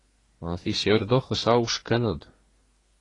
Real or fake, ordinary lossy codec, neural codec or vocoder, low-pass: fake; AAC, 32 kbps; codec, 24 kHz, 1 kbps, SNAC; 10.8 kHz